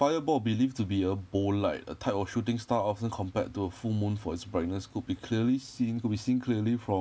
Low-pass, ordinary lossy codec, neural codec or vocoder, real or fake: none; none; none; real